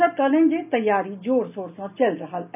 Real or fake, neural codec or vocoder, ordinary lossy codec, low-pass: real; none; none; 3.6 kHz